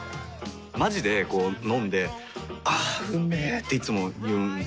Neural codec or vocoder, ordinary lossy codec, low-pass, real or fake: none; none; none; real